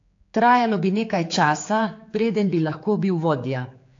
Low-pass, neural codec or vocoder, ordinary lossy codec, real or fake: 7.2 kHz; codec, 16 kHz, 4 kbps, X-Codec, HuBERT features, trained on general audio; AAC, 48 kbps; fake